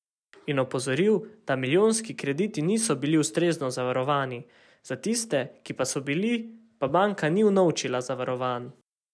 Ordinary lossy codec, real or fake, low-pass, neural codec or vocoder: none; real; none; none